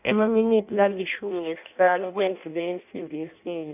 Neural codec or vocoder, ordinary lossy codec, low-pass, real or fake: codec, 16 kHz in and 24 kHz out, 0.6 kbps, FireRedTTS-2 codec; none; 3.6 kHz; fake